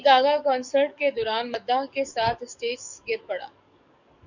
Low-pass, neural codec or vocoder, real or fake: 7.2 kHz; codec, 44.1 kHz, 7.8 kbps, DAC; fake